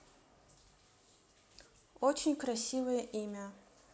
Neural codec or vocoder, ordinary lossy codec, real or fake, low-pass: none; none; real; none